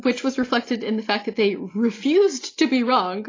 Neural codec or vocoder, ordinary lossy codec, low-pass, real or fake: none; AAC, 32 kbps; 7.2 kHz; real